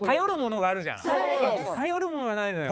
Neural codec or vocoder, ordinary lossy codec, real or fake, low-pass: codec, 16 kHz, 4 kbps, X-Codec, HuBERT features, trained on balanced general audio; none; fake; none